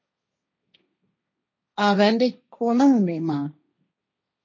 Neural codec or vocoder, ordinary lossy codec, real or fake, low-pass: codec, 16 kHz, 1.1 kbps, Voila-Tokenizer; MP3, 32 kbps; fake; 7.2 kHz